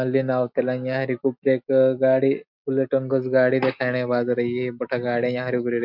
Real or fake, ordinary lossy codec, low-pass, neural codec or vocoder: real; MP3, 48 kbps; 5.4 kHz; none